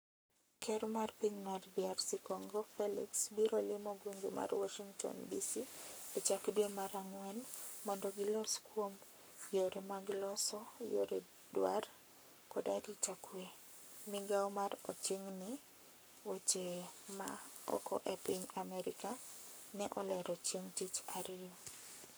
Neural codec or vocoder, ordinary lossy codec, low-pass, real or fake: codec, 44.1 kHz, 7.8 kbps, Pupu-Codec; none; none; fake